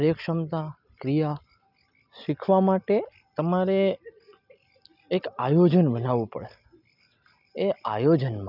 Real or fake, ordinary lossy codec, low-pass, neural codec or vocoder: real; none; 5.4 kHz; none